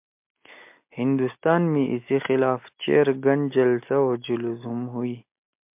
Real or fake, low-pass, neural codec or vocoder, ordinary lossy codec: real; 3.6 kHz; none; MP3, 32 kbps